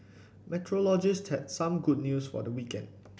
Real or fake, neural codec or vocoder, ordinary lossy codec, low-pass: real; none; none; none